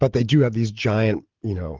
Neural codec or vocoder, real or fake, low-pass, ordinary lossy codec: none; real; 7.2 kHz; Opus, 16 kbps